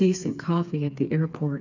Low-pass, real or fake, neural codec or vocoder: 7.2 kHz; fake; codec, 16 kHz, 4 kbps, FreqCodec, smaller model